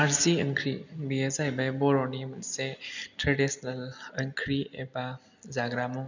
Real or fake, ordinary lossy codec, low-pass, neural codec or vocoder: real; none; 7.2 kHz; none